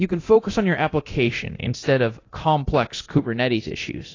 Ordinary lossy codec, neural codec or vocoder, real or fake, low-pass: AAC, 32 kbps; codec, 24 kHz, 0.9 kbps, DualCodec; fake; 7.2 kHz